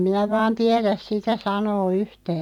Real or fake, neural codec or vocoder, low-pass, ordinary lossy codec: fake; vocoder, 44.1 kHz, 128 mel bands every 512 samples, BigVGAN v2; 19.8 kHz; none